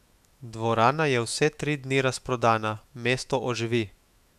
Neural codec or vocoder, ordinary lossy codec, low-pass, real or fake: autoencoder, 48 kHz, 128 numbers a frame, DAC-VAE, trained on Japanese speech; none; 14.4 kHz; fake